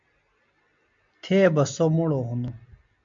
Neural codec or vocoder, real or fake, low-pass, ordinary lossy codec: none; real; 7.2 kHz; MP3, 64 kbps